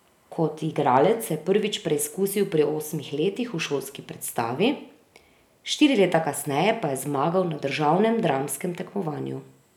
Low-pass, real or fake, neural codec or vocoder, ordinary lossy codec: 19.8 kHz; real; none; none